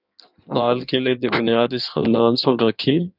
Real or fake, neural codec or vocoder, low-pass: fake; codec, 16 kHz in and 24 kHz out, 1.1 kbps, FireRedTTS-2 codec; 5.4 kHz